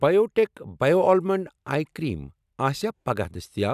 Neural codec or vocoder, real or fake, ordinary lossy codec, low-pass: none; real; none; 14.4 kHz